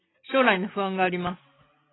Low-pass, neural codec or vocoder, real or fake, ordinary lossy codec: 7.2 kHz; none; real; AAC, 16 kbps